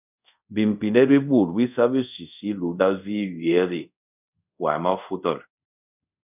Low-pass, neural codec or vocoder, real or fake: 3.6 kHz; codec, 24 kHz, 0.5 kbps, DualCodec; fake